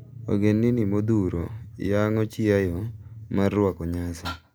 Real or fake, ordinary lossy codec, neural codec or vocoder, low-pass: real; none; none; none